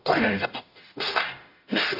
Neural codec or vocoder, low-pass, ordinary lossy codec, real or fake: codec, 44.1 kHz, 0.9 kbps, DAC; 5.4 kHz; AAC, 32 kbps; fake